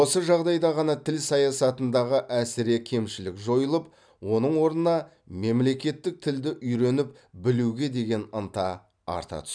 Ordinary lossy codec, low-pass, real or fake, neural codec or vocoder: none; 9.9 kHz; real; none